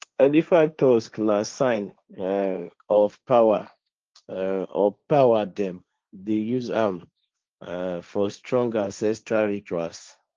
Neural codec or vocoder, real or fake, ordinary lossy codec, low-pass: codec, 16 kHz, 1.1 kbps, Voila-Tokenizer; fake; Opus, 24 kbps; 7.2 kHz